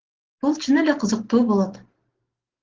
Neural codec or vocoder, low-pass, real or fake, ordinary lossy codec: none; 7.2 kHz; real; Opus, 16 kbps